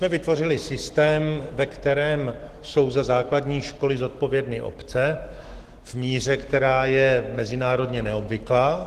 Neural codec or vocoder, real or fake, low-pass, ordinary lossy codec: autoencoder, 48 kHz, 128 numbers a frame, DAC-VAE, trained on Japanese speech; fake; 14.4 kHz; Opus, 16 kbps